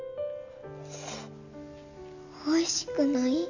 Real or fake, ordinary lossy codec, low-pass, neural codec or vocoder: real; none; 7.2 kHz; none